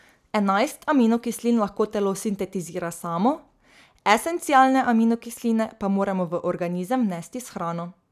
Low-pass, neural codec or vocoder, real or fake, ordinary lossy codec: 14.4 kHz; none; real; none